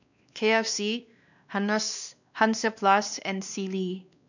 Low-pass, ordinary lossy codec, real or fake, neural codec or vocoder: 7.2 kHz; none; fake; codec, 16 kHz, 2 kbps, X-Codec, WavLM features, trained on Multilingual LibriSpeech